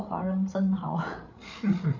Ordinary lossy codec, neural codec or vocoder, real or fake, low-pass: none; codec, 16 kHz, 8 kbps, FreqCodec, larger model; fake; 7.2 kHz